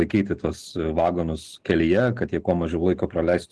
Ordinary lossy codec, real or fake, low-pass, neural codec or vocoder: Opus, 16 kbps; real; 10.8 kHz; none